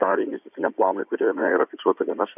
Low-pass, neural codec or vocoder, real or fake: 3.6 kHz; codec, 16 kHz in and 24 kHz out, 2.2 kbps, FireRedTTS-2 codec; fake